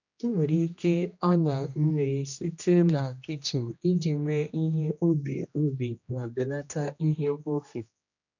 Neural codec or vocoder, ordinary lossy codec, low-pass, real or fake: codec, 16 kHz, 1 kbps, X-Codec, HuBERT features, trained on general audio; none; 7.2 kHz; fake